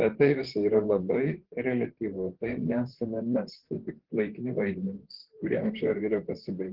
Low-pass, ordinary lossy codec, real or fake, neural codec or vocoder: 5.4 kHz; Opus, 16 kbps; fake; vocoder, 44.1 kHz, 128 mel bands, Pupu-Vocoder